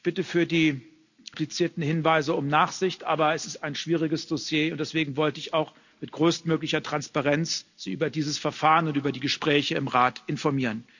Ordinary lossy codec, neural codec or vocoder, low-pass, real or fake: none; none; 7.2 kHz; real